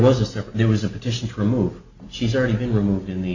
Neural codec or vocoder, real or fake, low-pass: none; real; 7.2 kHz